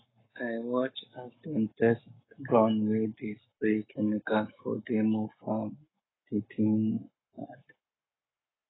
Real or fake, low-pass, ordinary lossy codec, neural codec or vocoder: fake; 7.2 kHz; AAC, 16 kbps; codec, 44.1 kHz, 7.8 kbps, Pupu-Codec